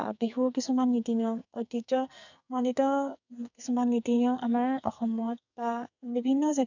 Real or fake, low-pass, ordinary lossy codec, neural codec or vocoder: fake; 7.2 kHz; none; codec, 44.1 kHz, 2.6 kbps, SNAC